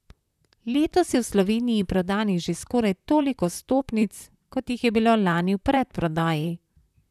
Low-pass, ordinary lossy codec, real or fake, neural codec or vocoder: 14.4 kHz; none; fake; vocoder, 44.1 kHz, 128 mel bands, Pupu-Vocoder